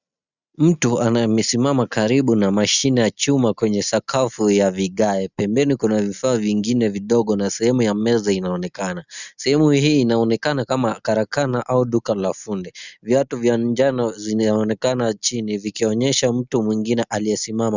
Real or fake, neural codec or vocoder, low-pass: real; none; 7.2 kHz